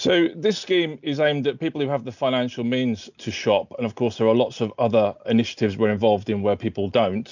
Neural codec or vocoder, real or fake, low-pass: none; real; 7.2 kHz